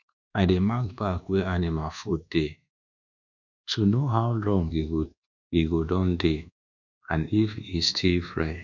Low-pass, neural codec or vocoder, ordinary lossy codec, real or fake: 7.2 kHz; codec, 24 kHz, 1.2 kbps, DualCodec; none; fake